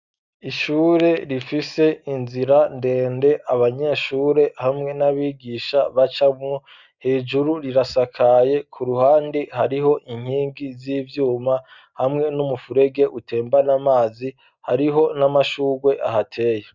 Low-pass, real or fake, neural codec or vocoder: 7.2 kHz; real; none